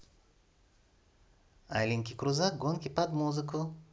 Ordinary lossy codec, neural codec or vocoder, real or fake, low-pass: none; none; real; none